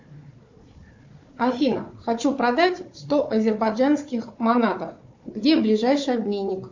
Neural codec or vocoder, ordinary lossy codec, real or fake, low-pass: codec, 16 kHz, 4 kbps, FunCodec, trained on Chinese and English, 50 frames a second; MP3, 48 kbps; fake; 7.2 kHz